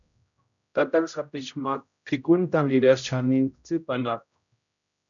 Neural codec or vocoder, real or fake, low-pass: codec, 16 kHz, 0.5 kbps, X-Codec, HuBERT features, trained on general audio; fake; 7.2 kHz